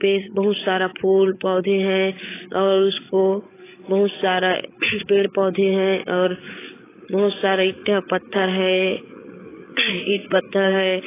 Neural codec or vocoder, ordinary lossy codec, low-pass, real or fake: codec, 16 kHz, 8 kbps, FreqCodec, larger model; AAC, 16 kbps; 3.6 kHz; fake